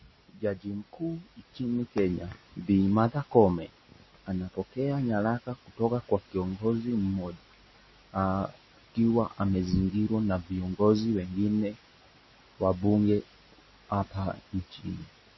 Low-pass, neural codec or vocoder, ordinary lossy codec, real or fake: 7.2 kHz; none; MP3, 24 kbps; real